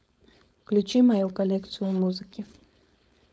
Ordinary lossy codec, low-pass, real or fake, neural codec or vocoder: none; none; fake; codec, 16 kHz, 4.8 kbps, FACodec